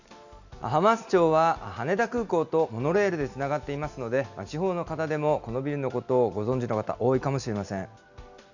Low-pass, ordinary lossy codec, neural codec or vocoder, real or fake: 7.2 kHz; none; none; real